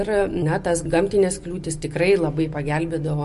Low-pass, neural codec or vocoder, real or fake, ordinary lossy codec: 14.4 kHz; none; real; MP3, 48 kbps